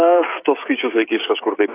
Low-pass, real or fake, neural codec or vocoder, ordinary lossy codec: 3.6 kHz; fake; codec, 16 kHz, 16 kbps, FreqCodec, smaller model; AAC, 24 kbps